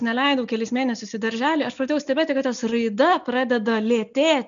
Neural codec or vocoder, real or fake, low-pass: none; real; 7.2 kHz